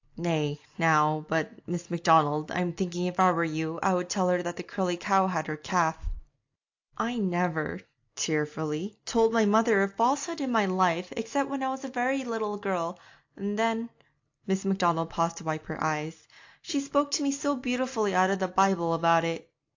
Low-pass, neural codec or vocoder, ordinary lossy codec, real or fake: 7.2 kHz; none; AAC, 48 kbps; real